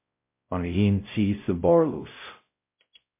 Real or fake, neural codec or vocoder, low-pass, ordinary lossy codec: fake; codec, 16 kHz, 0.5 kbps, X-Codec, WavLM features, trained on Multilingual LibriSpeech; 3.6 kHz; MP3, 32 kbps